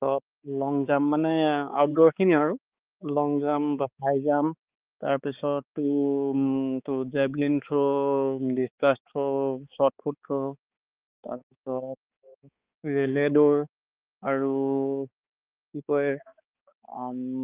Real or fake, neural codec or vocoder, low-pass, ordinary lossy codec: fake; codec, 16 kHz, 4 kbps, X-Codec, HuBERT features, trained on balanced general audio; 3.6 kHz; Opus, 32 kbps